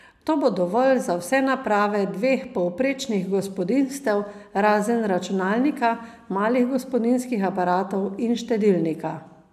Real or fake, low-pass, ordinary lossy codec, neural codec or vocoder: real; 14.4 kHz; none; none